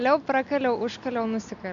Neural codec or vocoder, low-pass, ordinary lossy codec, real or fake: none; 7.2 kHz; MP3, 64 kbps; real